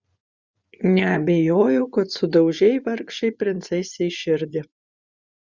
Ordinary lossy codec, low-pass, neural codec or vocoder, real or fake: Opus, 64 kbps; 7.2 kHz; vocoder, 24 kHz, 100 mel bands, Vocos; fake